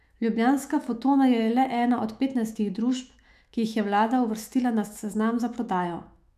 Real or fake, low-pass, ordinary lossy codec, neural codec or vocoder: fake; 14.4 kHz; none; autoencoder, 48 kHz, 128 numbers a frame, DAC-VAE, trained on Japanese speech